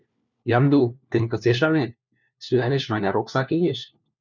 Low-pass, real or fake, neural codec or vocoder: 7.2 kHz; fake; codec, 16 kHz, 1 kbps, FunCodec, trained on LibriTTS, 50 frames a second